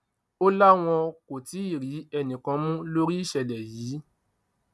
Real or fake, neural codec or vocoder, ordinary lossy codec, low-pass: real; none; none; none